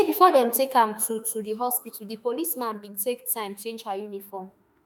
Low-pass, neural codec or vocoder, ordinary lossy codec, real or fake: none; autoencoder, 48 kHz, 32 numbers a frame, DAC-VAE, trained on Japanese speech; none; fake